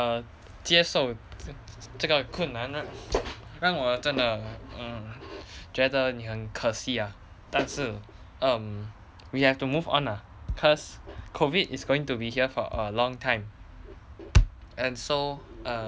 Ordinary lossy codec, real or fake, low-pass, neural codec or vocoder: none; real; none; none